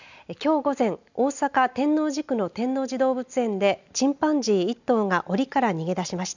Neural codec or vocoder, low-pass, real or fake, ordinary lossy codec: none; 7.2 kHz; real; none